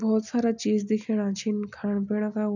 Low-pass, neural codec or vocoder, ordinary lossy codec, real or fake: 7.2 kHz; none; none; real